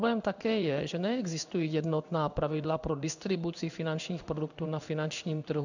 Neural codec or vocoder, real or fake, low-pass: codec, 16 kHz in and 24 kHz out, 1 kbps, XY-Tokenizer; fake; 7.2 kHz